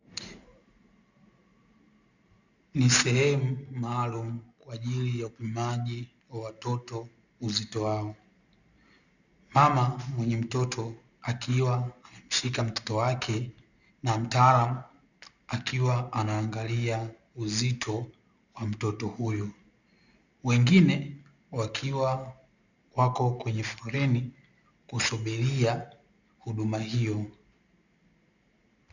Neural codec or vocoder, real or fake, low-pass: none; real; 7.2 kHz